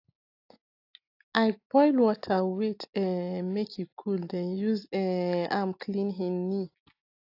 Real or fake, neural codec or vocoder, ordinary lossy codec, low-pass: real; none; AAC, 32 kbps; 5.4 kHz